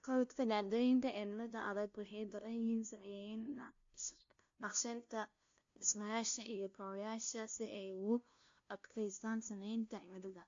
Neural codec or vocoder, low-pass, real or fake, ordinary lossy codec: codec, 16 kHz, 0.5 kbps, FunCodec, trained on LibriTTS, 25 frames a second; 7.2 kHz; fake; AAC, 48 kbps